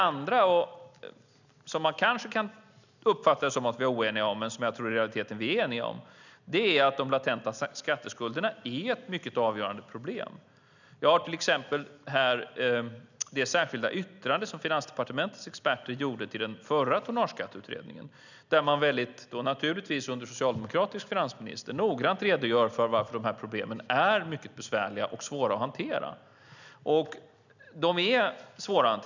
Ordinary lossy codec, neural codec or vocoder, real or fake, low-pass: none; none; real; 7.2 kHz